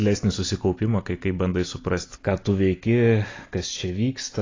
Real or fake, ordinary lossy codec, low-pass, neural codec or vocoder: real; AAC, 32 kbps; 7.2 kHz; none